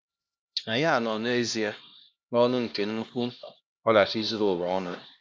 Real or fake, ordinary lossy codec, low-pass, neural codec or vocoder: fake; none; none; codec, 16 kHz, 1 kbps, X-Codec, HuBERT features, trained on LibriSpeech